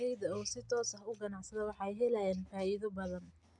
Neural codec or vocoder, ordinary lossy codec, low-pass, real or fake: none; MP3, 96 kbps; 10.8 kHz; real